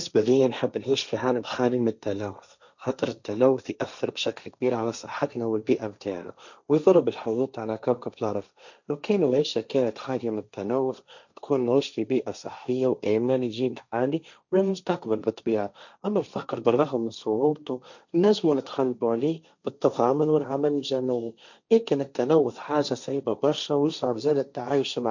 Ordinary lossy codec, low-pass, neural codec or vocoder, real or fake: none; 7.2 kHz; codec, 16 kHz, 1.1 kbps, Voila-Tokenizer; fake